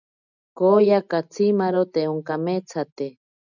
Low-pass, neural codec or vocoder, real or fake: 7.2 kHz; none; real